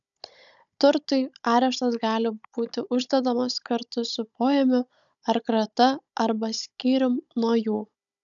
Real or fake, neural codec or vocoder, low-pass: fake; codec, 16 kHz, 16 kbps, FunCodec, trained on Chinese and English, 50 frames a second; 7.2 kHz